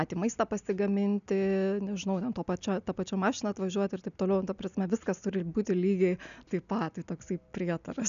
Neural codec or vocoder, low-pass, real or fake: none; 7.2 kHz; real